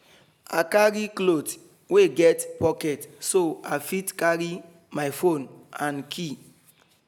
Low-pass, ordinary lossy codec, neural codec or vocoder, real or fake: none; none; none; real